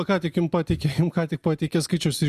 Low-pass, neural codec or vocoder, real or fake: 14.4 kHz; none; real